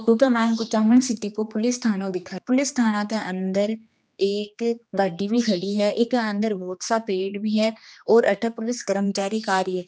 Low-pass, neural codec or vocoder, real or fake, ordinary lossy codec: none; codec, 16 kHz, 2 kbps, X-Codec, HuBERT features, trained on general audio; fake; none